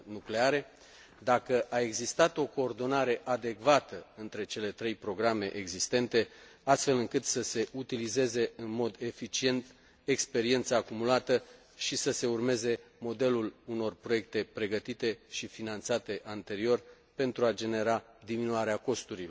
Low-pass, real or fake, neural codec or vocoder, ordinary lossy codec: none; real; none; none